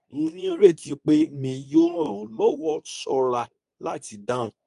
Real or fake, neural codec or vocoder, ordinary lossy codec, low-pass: fake; codec, 24 kHz, 0.9 kbps, WavTokenizer, medium speech release version 2; none; 10.8 kHz